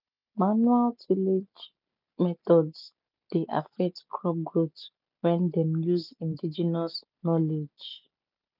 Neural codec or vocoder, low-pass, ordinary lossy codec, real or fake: none; 5.4 kHz; none; real